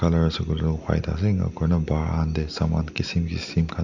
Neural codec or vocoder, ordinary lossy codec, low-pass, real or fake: none; none; 7.2 kHz; real